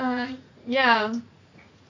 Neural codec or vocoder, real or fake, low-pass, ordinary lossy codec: vocoder, 44.1 kHz, 80 mel bands, Vocos; fake; 7.2 kHz; AAC, 48 kbps